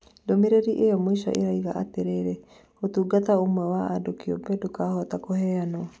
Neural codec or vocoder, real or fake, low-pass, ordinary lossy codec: none; real; none; none